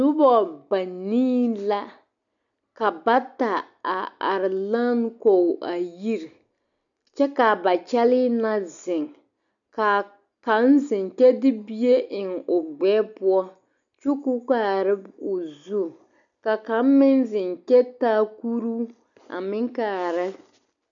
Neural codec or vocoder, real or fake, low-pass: none; real; 7.2 kHz